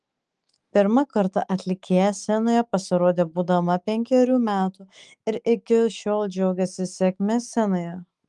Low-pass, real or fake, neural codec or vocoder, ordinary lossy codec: 10.8 kHz; fake; autoencoder, 48 kHz, 128 numbers a frame, DAC-VAE, trained on Japanese speech; Opus, 24 kbps